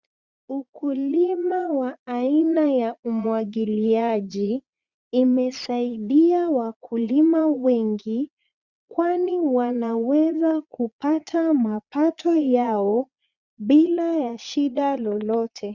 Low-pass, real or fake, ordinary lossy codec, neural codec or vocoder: 7.2 kHz; fake; Opus, 64 kbps; vocoder, 22.05 kHz, 80 mel bands, Vocos